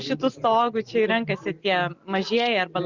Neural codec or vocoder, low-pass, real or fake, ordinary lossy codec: none; 7.2 kHz; real; Opus, 64 kbps